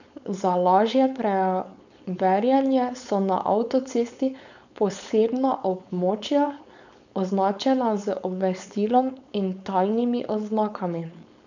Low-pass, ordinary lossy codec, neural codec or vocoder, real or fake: 7.2 kHz; none; codec, 16 kHz, 4.8 kbps, FACodec; fake